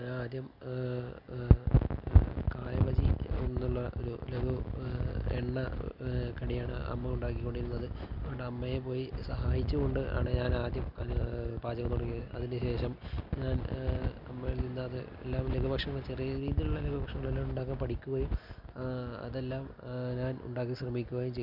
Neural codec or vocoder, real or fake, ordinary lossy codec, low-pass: none; real; none; 5.4 kHz